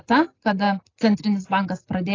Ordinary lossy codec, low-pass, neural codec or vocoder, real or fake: AAC, 32 kbps; 7.2 kHz; none; real